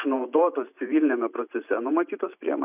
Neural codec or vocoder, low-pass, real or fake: vocoder, 44.1 kHz, 128 mel bands every 512 samples, BigVGAN v2; 3.6 kHz; fake